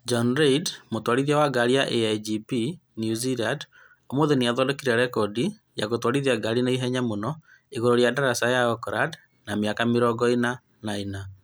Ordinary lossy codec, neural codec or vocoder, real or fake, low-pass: none; none; real; none